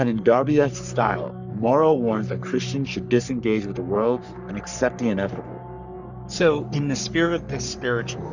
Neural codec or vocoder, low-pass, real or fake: codec, 44.1 kHz, 3.4 kbps, Pupu-Codec; 7.2 kHz; fake